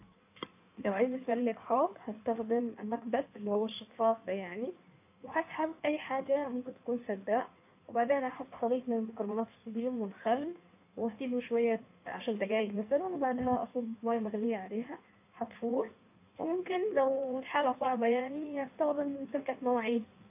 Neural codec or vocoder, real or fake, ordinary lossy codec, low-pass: codec, 16 kHz in and 24 kHz out, 1.1 kbps, FireRedTTS-2 codec; fake; AAC, 32 kbps; 3.6 kHz